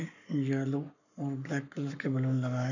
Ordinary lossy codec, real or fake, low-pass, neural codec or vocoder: none; fake; 7.2 kHz; codec, 44.1 kHz, 7.8 kbps, Pupu-Codec